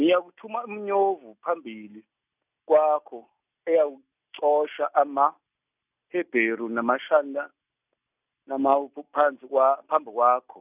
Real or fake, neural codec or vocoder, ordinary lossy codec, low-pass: real; none; none; 3.6 kHz